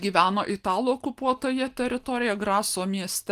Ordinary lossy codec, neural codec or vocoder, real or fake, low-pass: Opus, 24 kbps; none; real; 14.4 kHz